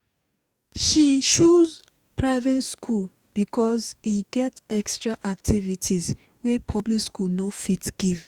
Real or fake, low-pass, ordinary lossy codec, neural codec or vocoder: fake; 19.8 kHz; Opus, 64 kbps; codec, 44.1 kHz, 2.6 kbps, DAC